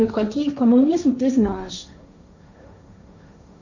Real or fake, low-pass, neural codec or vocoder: fake; 7.2 kHz; codec, 16 kHz, 1.1 kbps, Voila-Tokenizer